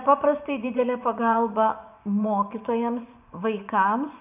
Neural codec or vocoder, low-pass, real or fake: vocoder, 24 kHz, 100 mel bands, Vocos; 3.6 kHz; fake